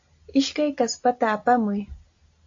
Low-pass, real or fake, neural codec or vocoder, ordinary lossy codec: 7.2 kHz; real; none; AAC, 48 kbps